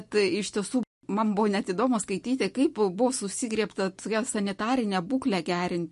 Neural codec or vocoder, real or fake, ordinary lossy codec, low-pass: none; real; MP3, 48 kbps; 14.4 kHz